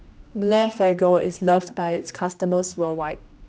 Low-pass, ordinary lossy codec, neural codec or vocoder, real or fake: none; none; codec, 16 kHz, 1 kbps, X-Codec, HuBERT features, trained on general audio; fake